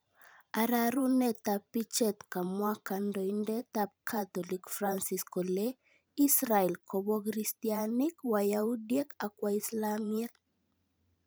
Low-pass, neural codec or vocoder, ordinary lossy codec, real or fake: none; vocoder, 44.1 kHz, 128 mel bands every 512 samples, BigVGAN v2; none; fake